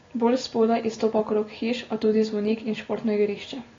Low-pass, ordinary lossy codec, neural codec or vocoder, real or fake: 7.2 kHz; AAC, 32 kbps; none; real